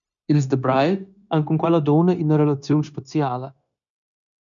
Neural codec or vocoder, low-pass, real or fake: codec, 16 kHz, 0.9 kbps, LongCat-Audio-Codec; 7.2 kHz; fake